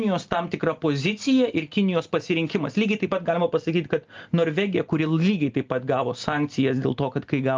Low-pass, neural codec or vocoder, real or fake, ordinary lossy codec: 7.2 kHz; none; real; Opus, 32 kbps